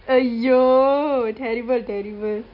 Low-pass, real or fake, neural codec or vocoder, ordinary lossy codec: 5.4 kHz; real; none; none